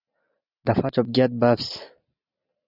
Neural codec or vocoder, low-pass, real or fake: none; 5.4 kHz; real